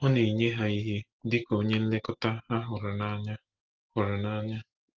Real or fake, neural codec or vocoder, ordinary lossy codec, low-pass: real; none; Opus, 16 kbps; 7.2 kHz